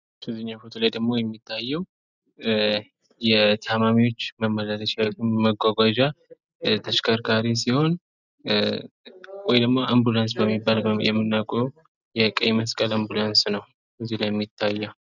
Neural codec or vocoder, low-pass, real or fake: none; 7.2 kHz; real